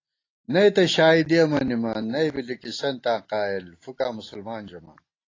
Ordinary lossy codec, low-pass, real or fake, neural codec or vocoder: AAC, 32 kbps; 7.2 kHz; real; none